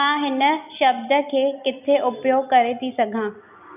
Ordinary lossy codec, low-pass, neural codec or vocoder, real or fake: none; 3.6 kHz; none; real